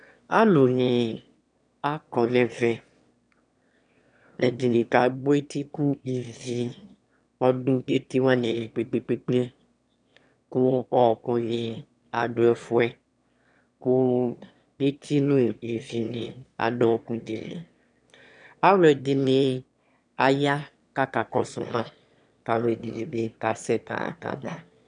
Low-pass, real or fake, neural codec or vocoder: 9.9 kHz; fake; autoencoder, 22.05 kHz, a latent of 192 numbers a frame, VITS, trained on one speaker